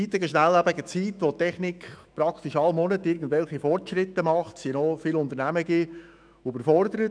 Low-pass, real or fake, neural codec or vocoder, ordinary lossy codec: 9.9 kHz; fake; autoencoder, 48 kHz, 128 numbers a frame, DAC-VAE, trained on Japanese speech; none